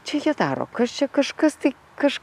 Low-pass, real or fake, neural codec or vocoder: 14.4 kHz; real; none